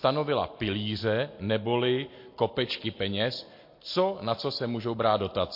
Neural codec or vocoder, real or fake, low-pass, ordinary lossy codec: none; real; 5.4 kHz; MP3, 32 kbps